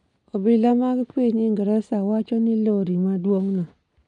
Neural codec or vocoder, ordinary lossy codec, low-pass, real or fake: none; none; 10.8 kHz; real